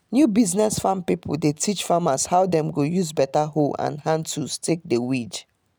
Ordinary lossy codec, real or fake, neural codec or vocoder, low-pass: none; real; none; none